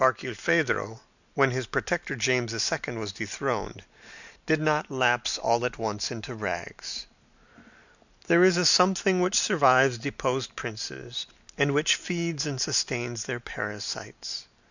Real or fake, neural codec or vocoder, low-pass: real; none; 7.2 kHz